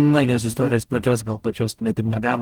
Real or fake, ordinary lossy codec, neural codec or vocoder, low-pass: fake; Opus, 32 kbps; codec, 44.1 kHz, 0.9 kbps, DAC; 19.8 kHz